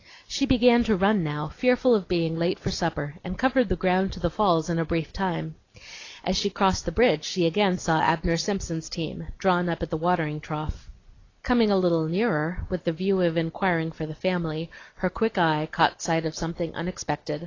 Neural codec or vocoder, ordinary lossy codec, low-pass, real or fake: none; AAC, 32 kbps; 7.2 kHz; real